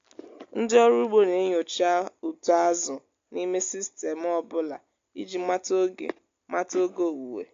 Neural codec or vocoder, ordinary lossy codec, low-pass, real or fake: none; AAC, 48 kbps; 7.2 kHz; real